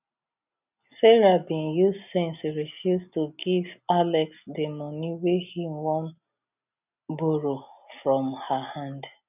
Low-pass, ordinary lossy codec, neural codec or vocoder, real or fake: 3.6 kHz; none; none; real